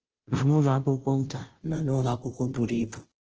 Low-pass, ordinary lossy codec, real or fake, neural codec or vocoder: 7.2 kHz; Opus, 16 kbps; fake; codec, 16 kHz, 0.5 kbps, FunCodec, trained on Chinese and English, 25 frames a second